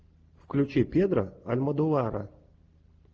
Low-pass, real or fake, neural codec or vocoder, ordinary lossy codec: 7.2 kHz; real; none; Opus, 24 kbps